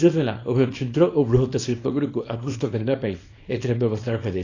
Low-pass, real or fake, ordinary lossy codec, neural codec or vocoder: 7.2 kHz; fake; AAC, 32 kbps; codec, 24 kHz, 0.9 kbps, WavTokenizer, small release